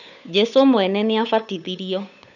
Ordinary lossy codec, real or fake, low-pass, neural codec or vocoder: none; fake; 7.2 kHz; codec, 16 kHz, 8 kbps, FunCodec, trained on Chinese and English, 25 frames a second